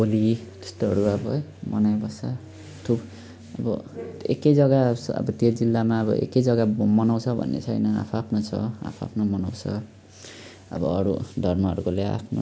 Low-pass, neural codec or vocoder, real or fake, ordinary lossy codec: none; none; real; none